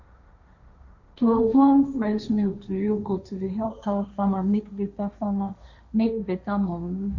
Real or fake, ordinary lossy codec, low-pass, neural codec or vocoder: fake; none; 7.2 kHz; codec, 16 kHz, 1.1 kbps, Voila-Tokenizer